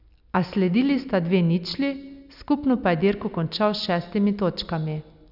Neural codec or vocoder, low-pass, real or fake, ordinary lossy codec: none; 5.4 kHz; real; none